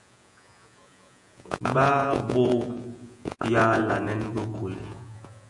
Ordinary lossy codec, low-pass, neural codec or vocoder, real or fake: AAC, 64 kbps; 10.8 kHz; vocoder, 48 kHz, 128 mel bands, Vocos; fake